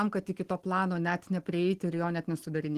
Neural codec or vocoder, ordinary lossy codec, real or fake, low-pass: none; Opus, 16 kbps; real; 14.4 kHz